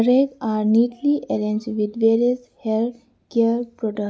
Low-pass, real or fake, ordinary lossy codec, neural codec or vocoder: none; real; none; none